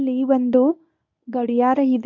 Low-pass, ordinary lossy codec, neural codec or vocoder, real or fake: 7.2 kHz; MP3, 64 kbps; codec, 24 kHz, 0.9 kbps, WavTokenizer, medium speech release version 1; fake